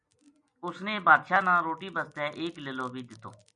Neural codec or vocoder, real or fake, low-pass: none; real; 9.9 kHz